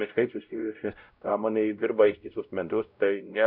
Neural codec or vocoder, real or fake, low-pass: codec, 16 kHz, 0.5 kbps, X-Codec, WavLM features, trained on Multilingual LibriSpeech; fake; 5.4 kHz